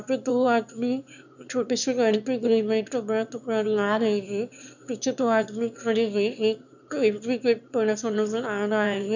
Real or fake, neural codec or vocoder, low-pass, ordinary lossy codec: fake; autoencoder, 22.05 kHz, a latent of 192 numbers a frame, VITS, trained on one speaker; 7.2 kHz; none